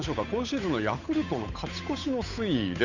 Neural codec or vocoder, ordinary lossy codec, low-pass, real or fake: codec, 16 kHz, 8 kbps, FunCodec, trained on Chinese and English, 25 frames a second; none; 7.2 kHz; fake